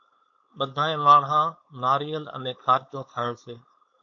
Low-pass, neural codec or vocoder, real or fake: 7.2 kHz; codec, 16 kHz, 4.8 kbps, FACodec; fake